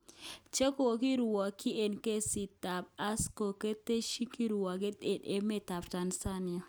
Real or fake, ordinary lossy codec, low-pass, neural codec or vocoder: real; none; none; none